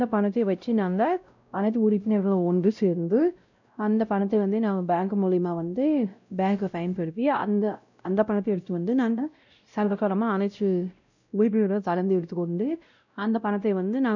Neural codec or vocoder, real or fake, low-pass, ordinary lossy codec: codec, 16 kHz, 0.5 kbps, X-Codec, WavLM features, trained on Multilingual LibriSpeech; fake; 7.2 kHz; none